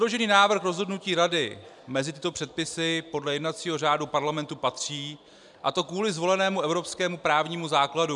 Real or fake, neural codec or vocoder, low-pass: real; none; 10.8 kHz